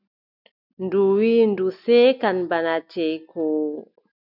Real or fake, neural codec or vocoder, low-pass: real; none; 5.4 kHz